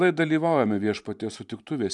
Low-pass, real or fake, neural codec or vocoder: 10.8 kHz; fake; vocoder, 44.1 kHz, 128 mel bands every 512 samples, BigVGAN v2